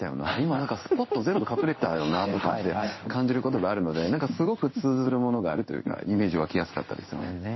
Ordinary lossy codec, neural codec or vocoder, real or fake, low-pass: MP3, 24 kbps; codec, 16 kHz in and 24 kHz out, 1 kbps, XY-Tokenizer; fake; 7.2 kHz